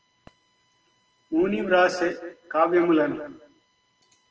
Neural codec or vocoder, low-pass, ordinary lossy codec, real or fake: none; 7.2 kHz; Opus, 16 kbps; real